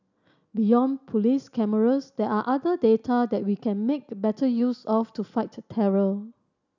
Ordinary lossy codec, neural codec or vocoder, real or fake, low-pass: none; none; real; 7.2 kHz